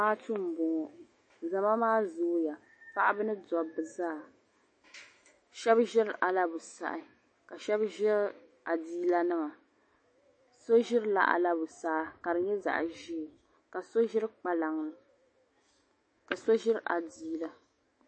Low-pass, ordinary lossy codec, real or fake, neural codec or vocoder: 9.9 kHz; MP3, 32 kbps; fake; autoencoder, 48 kHz, 128 numbers a frame, DAC-VAE, trained on Japanese speech